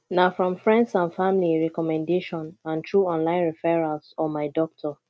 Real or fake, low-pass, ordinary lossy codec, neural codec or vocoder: real; none; none; none